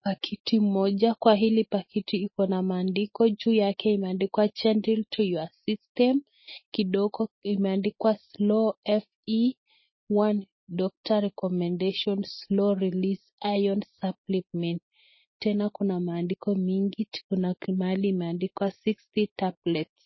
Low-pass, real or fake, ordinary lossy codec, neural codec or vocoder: 7.2 kHz; real; MP3, 24 kbps; none